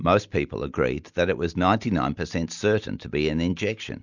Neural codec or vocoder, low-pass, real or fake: none; 7.2 kHz; real